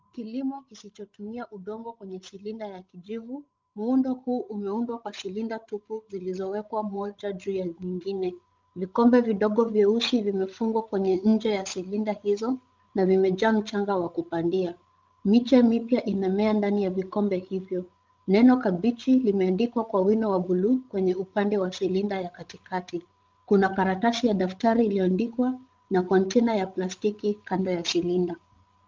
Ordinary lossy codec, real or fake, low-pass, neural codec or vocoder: Opus, 24 kbps; fake; 7.2 kHz; codec, 16 kHz, 16 kbps, FreqCodec, larger model